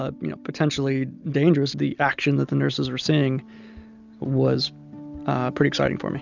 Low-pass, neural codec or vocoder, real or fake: 7.2 kHz; none; real